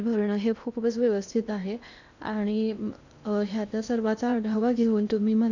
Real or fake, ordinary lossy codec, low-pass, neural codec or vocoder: fake; none; 7.2 kHz; codec, 16 kHz in and 24 kHz out, 0.8 kbps, FocalCodec, streaming, 65536 codes